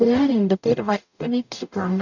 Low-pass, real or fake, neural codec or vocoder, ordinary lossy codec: 7.2 kHz; fake; codec, 44.1 kHz, 0.9 kbps, DAC; none